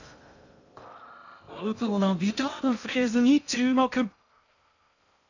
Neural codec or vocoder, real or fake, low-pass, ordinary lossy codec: codec, 16 kHz in and 24 kHz out, 0.6 kbps, FocalCodec, streaming, 2048 codes; fake; 7.2 kHz; none